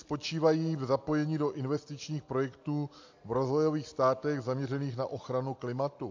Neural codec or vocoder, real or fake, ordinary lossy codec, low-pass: none; real; AAC, 48 kbps; 7.2 kHz